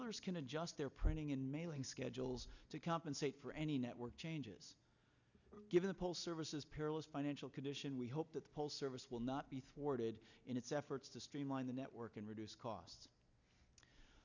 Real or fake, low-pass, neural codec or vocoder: real; 7.2 kHz; none